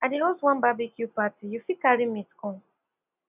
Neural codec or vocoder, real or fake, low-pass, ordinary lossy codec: none; real; 3.6 kHz; none